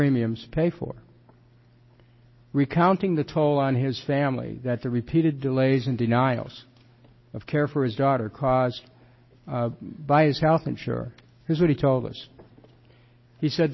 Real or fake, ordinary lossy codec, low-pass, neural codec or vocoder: real; MP3, 24 kbps; 7.2 kHz; none